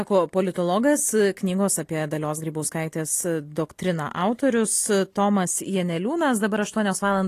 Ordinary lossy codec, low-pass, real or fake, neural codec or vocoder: AAC, 48 kbps; 14.4 kHz; real; none